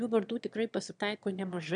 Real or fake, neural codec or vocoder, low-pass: fake; autoencoder, 22.05 kHz, a latent of 192 numbers a frame, VITS, trained on one speaker; 9.9 kHz